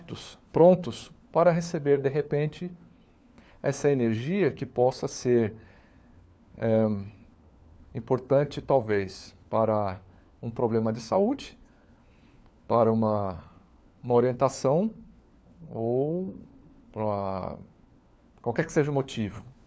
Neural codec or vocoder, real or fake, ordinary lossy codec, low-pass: codec, 16 kHz, 4 kbps, FunCodec, trained on LibriTTS, 50 frames a second; fake; none; none